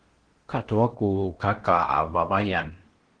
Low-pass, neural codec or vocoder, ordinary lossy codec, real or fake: 9.9 kHz; codec, 16 kHz in and 24 kHz out, 0.8 kbps, FocalCodec, streaming, 65536 codes; Opus, 16 kbps; fake